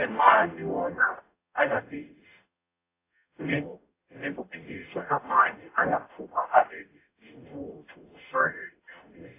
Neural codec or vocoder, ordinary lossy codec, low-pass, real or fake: codec, 44.1 kHz, 0.9 kbps, DAC; AAC, 24 kbps; 3.6 kHz; fake